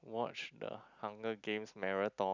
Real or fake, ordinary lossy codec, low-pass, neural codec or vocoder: real; none; 7.2 kHz; none